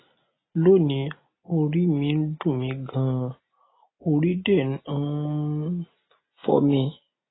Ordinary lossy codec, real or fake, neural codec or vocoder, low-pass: AAC, 16 kbps; real; none; 7.2 kHz